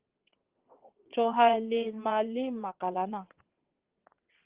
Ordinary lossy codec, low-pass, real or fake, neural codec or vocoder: Opus, 16 kbps; 3.6 kHz; fake; vocoder, 22.05 kHz, 80 mel bands, WaveNeXt